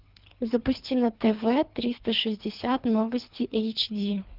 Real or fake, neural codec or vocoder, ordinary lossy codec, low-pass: fake; codec, 24 kHz, 3 kbps, HILCodec; Opus, 24 kbps; 5.4 kHz